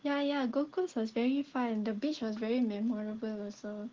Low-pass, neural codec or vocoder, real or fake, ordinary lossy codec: 7.2 kHz; none; real; Opus, 16 kbps